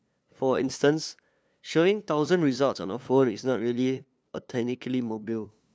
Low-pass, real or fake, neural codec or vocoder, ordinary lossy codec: none; fake; codec, 16 kHz, 2 kbps, FunCodec, trained on LibriTTS, 25 frames a second; none